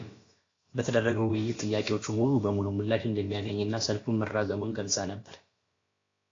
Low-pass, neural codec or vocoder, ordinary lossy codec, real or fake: 7.2 kHz; codec, 16 kHz, about 1 kbps, DyCAST, with the encoder's durations; AAC, 32 kbps; fake